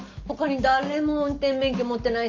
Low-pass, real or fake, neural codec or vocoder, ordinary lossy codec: 7.2 kHz; real; none; Opus, 24 kbps